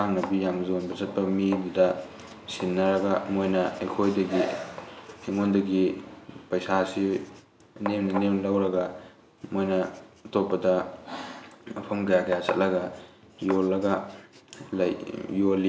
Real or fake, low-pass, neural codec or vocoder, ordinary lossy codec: real; none; none; none